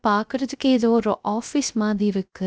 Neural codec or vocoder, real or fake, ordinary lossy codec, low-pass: codec, 16 kHz, 0.3 kbps, FocalCodec; fake; none; none